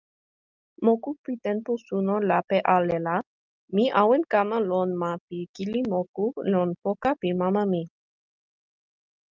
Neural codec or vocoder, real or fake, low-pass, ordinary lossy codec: none; real; 7.2 kHz; Opus, 32 kbps